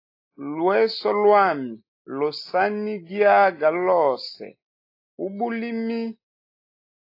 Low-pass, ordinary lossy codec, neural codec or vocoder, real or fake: 5.4 kHz; AAC, 32 kbps; none; real